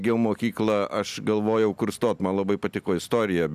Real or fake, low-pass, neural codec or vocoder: real; 14.4 kHz; none